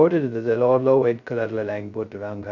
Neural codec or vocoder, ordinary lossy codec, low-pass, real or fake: codec, 16 kHz, 0.2 kbps, FocalCodec; none; 7.2 kHz; fake